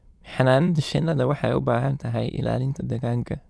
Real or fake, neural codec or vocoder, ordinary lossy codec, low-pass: fake; autoencoder, 22.05 kHz, a latent of 192 numbers a frame, VITS, trained on many speakers; none; none